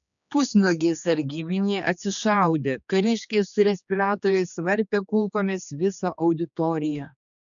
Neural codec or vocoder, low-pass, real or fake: codec, 16 kHz, 2 kbps, X-Codec, HuBERT features, trained on general audio; 7.2 kHz; fake